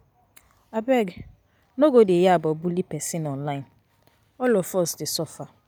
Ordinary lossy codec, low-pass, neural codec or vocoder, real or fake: none; none; none; real